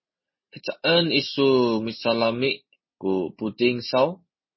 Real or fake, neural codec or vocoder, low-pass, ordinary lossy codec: real; none; 7.2 kHz; MP3, 24 kbps